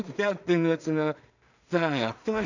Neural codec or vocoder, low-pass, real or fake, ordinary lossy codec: codec, 16 kHz in and 24 kHz out, 0.4 kbps, LongCat-Audio-Codec, two codebook decoder; 7.2 kHz; fake; none